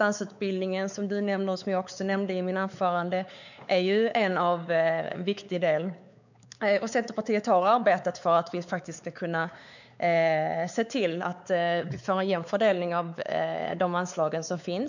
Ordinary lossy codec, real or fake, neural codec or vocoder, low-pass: none; fake; codec, 16 kHz, 4 kbps, X-Codec, WavLM features, trained on Multilingual LibriSpeech; 7.2 kHz